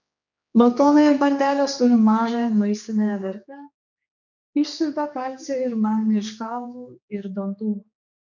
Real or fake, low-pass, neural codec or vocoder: fake; 7.2 kHz; codec, 16 kHz, 2 kbps, X-Codec, HuBERT features, trained on general audio